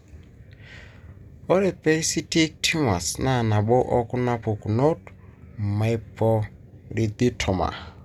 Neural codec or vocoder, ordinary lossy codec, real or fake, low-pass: none; none; real; 19.8 kHz